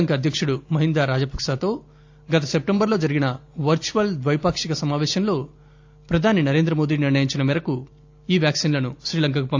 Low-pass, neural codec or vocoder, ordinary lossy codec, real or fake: 7.2 kHz; none; AAC, 48 kbps; real